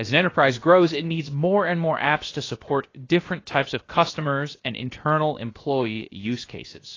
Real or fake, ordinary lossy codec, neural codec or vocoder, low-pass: fake; AAC, 32 kbps; codec, 16 kHz, about 1 kbps, DyCAST, with the encoder's durations; 7.2 kHz